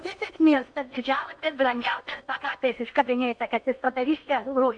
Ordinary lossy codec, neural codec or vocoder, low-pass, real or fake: MP3, 64 kbps; codec, 16 kHz in and 24 kHz out, 0.8 kbps, FocalCodec, streaming, 65536 codes; 9.9 kHz; fake